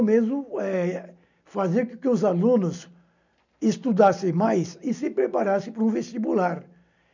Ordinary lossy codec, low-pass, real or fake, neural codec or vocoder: none; 7.2 kHz; real; none